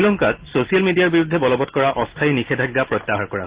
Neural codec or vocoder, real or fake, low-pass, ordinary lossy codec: none; real; 3.6 kHz; Opus, 16 kbps